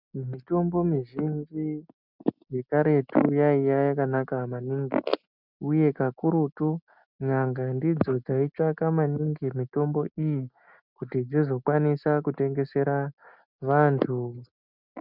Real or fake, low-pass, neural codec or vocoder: real; 5.4 kHz; none